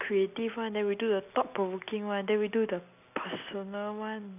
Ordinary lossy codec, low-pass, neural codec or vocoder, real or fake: none; 3.6 kHz; none; real